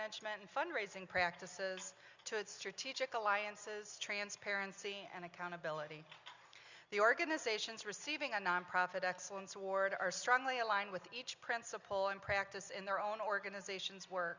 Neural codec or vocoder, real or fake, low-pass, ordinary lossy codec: none; real; 7.2 kHz; Opus, 64 kbps